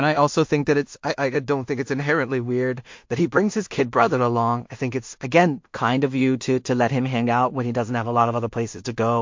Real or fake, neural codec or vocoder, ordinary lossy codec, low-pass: fake; codec, 16 kHz in and 24 kHz out, 0.4 kbps, LongCat-Audio-Codec, two codebook decoder; MP3, 48 kbps; 7.2 kHz